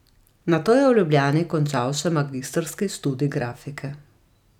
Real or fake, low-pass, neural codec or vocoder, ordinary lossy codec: real; 19.8 kHz; none; none